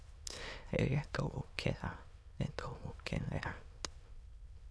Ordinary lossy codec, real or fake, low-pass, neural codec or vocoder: none; fake; none; autoencoder, 22.05 kHz, a latent of 192 numbers a frame, VITS, trained on many speakers